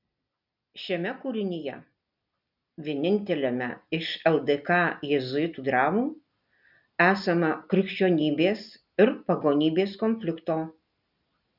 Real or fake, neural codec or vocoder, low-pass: real; none; 5.4 kHz